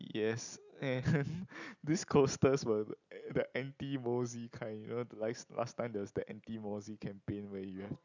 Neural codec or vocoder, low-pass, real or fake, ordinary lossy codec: none; 7.2 kHz; real; none